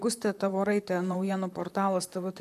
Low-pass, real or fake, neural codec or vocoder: 14.4 kHz; fake; vocoder, 44.1 kHz, 128 mel bands, Pupu-Vocoder